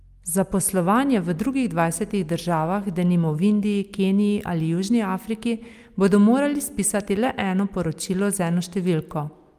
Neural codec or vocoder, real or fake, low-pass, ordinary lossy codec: none; real; 14.4 kHz; Opus, 32 kbps